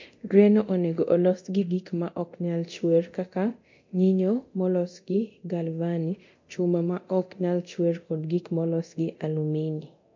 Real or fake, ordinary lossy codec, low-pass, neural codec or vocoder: fake; MP3, 48 kbps; 7.2 kHz; codec, 24 kHz, 0.9 kbps, DualCodec